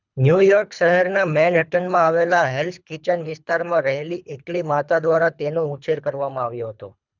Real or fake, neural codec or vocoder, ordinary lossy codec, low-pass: fake; codec, 24 kHz, 3 kbps, HILCodec; none; 7.2 kHz